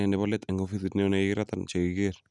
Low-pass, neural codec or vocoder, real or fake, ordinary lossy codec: 10.8 kHz; none; real; none